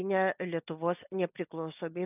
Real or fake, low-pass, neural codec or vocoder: real; 3.6 kHz; none